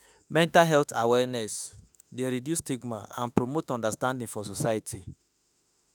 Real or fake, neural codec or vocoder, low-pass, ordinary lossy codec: fake; autoencoder, 48 kHz, 32 numbers a frame, DAC-VAE, trained on Japanese speech; none; none